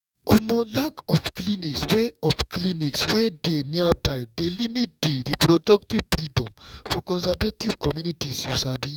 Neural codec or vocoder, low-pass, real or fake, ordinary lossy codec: codec, 44.1 kHz, 2.6 kbps, DAC; 19.8 kHz; fake; none